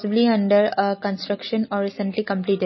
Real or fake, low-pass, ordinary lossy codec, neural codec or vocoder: real; 7.2 kHz; MP3, 24 kbps; none